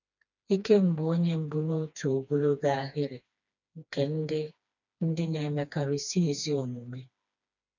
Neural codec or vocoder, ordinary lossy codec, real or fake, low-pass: codec, 16 kHz, 2 kbps, FreqCodec, smaller model; none; fake; 7.2 kHz